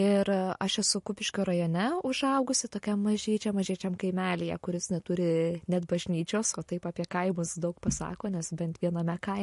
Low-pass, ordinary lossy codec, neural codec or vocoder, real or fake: 14.4 kHz; MP3, 48 kbps; none; real